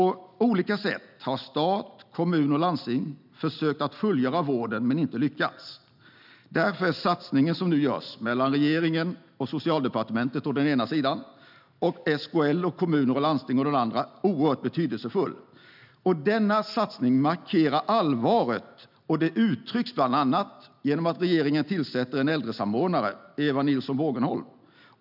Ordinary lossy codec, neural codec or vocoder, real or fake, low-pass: none; none; real; 5.4 kHz